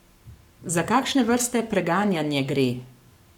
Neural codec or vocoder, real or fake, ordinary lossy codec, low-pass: codec, 44.1 kHz, 7.8 kbps, Pupu-Codec; fake; none; 19.8 kHz